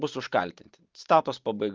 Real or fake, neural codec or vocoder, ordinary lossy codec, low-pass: real; none; Opus, 32 kbps; 7.2 kHz